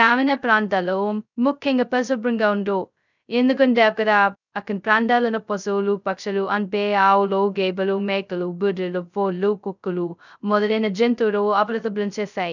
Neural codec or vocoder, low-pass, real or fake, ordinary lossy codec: codec, 16 kHz, 0.2 kbps, FocalCodec; 7.2 kHz; fake; none